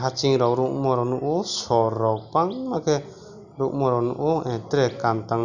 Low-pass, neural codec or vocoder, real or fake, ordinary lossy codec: 7.2 kHz; none; real; none